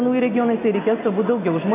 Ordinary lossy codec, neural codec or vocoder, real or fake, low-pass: AAC, 32 kbps; none; real; 3.6 kHz